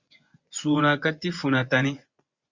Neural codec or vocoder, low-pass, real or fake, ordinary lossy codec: vocoder, 24 kHz, 100 mel bands, Vocos; 7.2 kHz; fake; Opus, 64 kbps